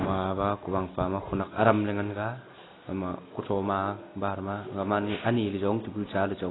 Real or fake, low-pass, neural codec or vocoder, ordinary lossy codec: real; 7.2 kHz; none; AAC, 16 kbps